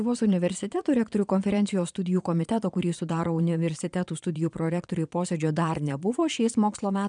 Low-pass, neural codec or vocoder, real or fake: 9.9 kHz; vocoder, 22.05 kHz, 80 mel bands, Vocos; fake